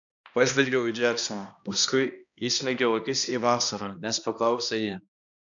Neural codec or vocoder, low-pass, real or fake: codec, 16 kHz, 1 kbps, X-Codec, HuBERT features, trained on balanced general audio; 7.2 kHz; fake